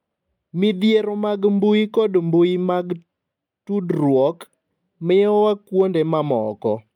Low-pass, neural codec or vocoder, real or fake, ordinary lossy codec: 19.8 kHz; none; real; MP3, 96 kbps